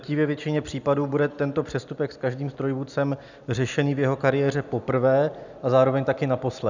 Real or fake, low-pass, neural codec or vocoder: real; 7.2 kHz; none